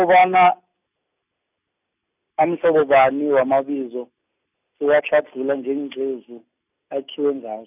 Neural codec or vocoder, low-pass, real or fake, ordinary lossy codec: none; 3.6 kHz; real; none